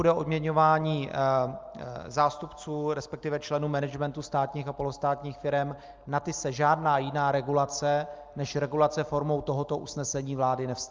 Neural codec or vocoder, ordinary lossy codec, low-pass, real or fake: none; Opus, 24 kbps; 7.2 kHz; real